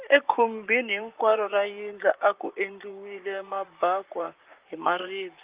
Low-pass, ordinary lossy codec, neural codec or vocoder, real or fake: 3.6 kHz; Opus, 24 kbps; vocoder, 44.1 kHz, 128 mel bands, Pupu-Vocoder; fake